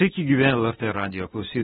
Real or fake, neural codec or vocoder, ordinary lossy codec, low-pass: real; none; AAC, 16 kbps; 19.8 kHz